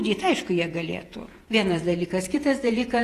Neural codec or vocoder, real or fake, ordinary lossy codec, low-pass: vocoder, 48 kHz, 128 mel bands, Vocos; fake; AAC, 48 kbps; 14.4 kHz